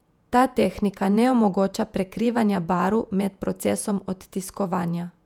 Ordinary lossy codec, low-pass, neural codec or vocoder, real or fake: none; 19.8 kHz; vocoder, 48 kHz, 128 mel bands, Vocos; fake